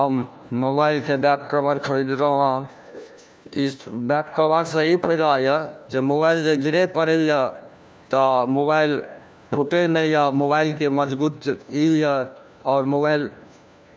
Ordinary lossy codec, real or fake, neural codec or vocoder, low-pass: none; fake; codec, 16 kHz, 1 kbps, FunCodec, trained on LibriTTS, 50 frames a second; none